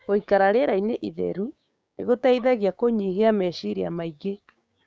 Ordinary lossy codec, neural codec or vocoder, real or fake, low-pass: none; codec, 16 kHz, 6 kbps, DAC; fake; none